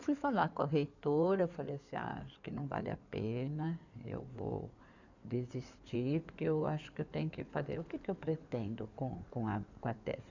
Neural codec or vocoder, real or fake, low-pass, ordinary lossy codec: codec, 16 kHz in and 24 kHz out, 2.2 kbps, FireRedTTS-2 codec; fake; 7.2 kHz; none